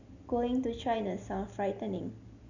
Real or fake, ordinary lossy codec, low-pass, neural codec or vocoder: real; none; 7.2 kHz; none